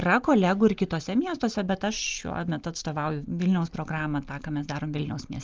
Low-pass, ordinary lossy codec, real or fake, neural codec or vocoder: 7.2 kHz; Opus, 24 kbps; real; none